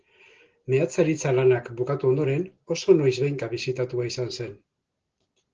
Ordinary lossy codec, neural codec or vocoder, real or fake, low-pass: Opus, 16 kbps; none; real; 7.2 kHz